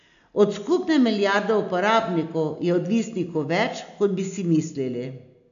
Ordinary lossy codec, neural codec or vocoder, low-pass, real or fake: MP3, 96 kbps; none; 7.2 kHz; real